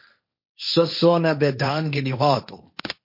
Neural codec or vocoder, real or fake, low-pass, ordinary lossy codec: codec, 16 kHz, 1.1 kbps, Voila-Tokenizer; fake; 5.4 kHz; MP3, 32 kbps